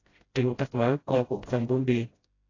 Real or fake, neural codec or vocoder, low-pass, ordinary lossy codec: fake; codec, 16 kHz, 0.5 kbps, FreqCodec, smaller model; 7.2 kHz; AAC, 32 kbps